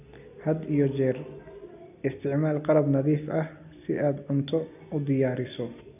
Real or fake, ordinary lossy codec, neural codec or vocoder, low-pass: real; none; none; 3.6 kHz